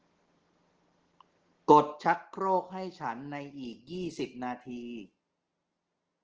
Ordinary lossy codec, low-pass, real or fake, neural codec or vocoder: Opus, 16 kbps; 7.2 kHz; real; none